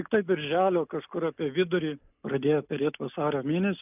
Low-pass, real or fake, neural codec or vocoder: 3.6 kHz; real; none